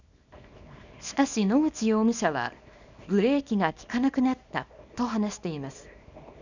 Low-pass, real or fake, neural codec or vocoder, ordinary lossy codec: 7.2 kHz; fake; codec, 24 kHz, 0.9 kbps, WavTokenizer, small release; none